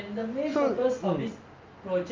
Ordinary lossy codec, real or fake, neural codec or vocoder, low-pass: Opus, 24 kbps; real; none; 7.2 kHz